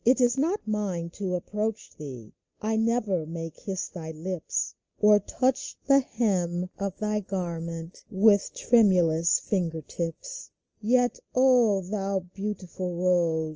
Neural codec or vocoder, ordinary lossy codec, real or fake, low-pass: none; Opus, 24 kbps; real; 7.2 kHz